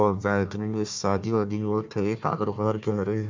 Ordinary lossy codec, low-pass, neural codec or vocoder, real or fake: MP3, 64 kbps; 7.2 kHz; codec, 16 kHz, 1 kbps, FunCodec, trained on Chinese and English, 50 frames a second; fake